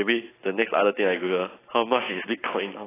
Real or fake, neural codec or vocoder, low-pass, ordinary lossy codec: fake; vocoder, 44.1 kHz, 128 mel bands every 512 samples, BigVGAN v2; 3.6 kHz; AAC, 16 kbps